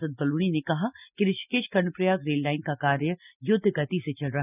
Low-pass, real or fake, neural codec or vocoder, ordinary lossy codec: 3.6 kHz; real; none; none